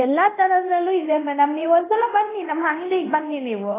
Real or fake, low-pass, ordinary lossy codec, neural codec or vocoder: fake; 3.6 kHz; AAC, 16 kbps; codec, 24 kHz, 0.9 kbps, DualCodec